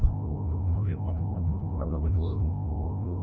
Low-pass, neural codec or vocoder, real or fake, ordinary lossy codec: none; codec, 16 kHz, 0.5 kbps, FreqCodec, larger model; fake; none